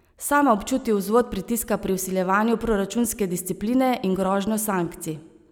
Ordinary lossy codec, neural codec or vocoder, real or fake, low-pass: none; none; real; none